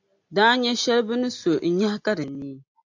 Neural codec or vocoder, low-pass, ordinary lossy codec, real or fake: none; 7.2 kHz; AAC, 48 kbps; real